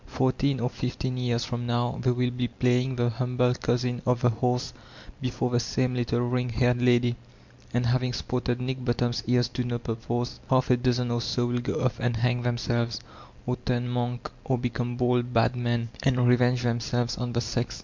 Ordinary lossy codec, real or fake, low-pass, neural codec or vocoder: MP3, 64 kbps; real; 7.2 kHz; none